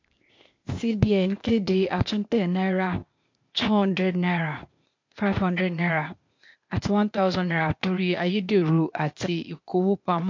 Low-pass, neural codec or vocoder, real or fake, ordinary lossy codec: 7.2 kHz; codec, 16 kHz, 0.8 kbps, ZipCodec; fake; MP3, 48 kbps